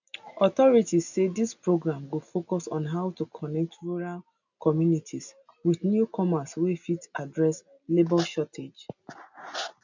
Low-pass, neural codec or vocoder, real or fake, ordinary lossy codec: 7.2 kHz; none; real; none